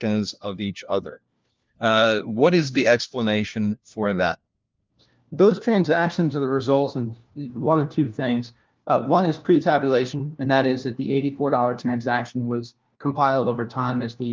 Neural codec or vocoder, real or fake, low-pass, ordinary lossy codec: codec, 16 kHz, 1 kbps, FunCodec, trained on LibriTTS, 50 frames a second; fake; 7.2 kHz; Opus, 24 kbps